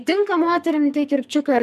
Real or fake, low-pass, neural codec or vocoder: fake; 14.4 kHz; codec, 44.1 kHz, 2.6 kbps, SNAC